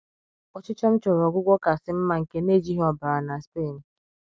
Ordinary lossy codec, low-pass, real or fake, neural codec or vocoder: none; none; real; none